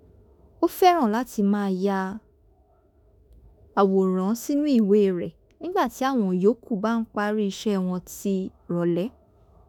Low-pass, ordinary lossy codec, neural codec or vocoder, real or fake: none; none; autoencoder, 48 kHz, 32 numbers a frame, DAC-VAE, trained on Japanese speech; fake